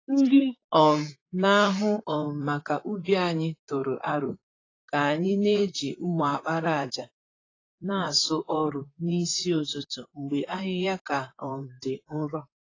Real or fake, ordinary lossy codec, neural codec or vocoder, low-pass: fake; AAC, 32 kbps; vocoder, 44.1 kHz, 128 mel bands, Pupu-Vocoder; 7.2 kHz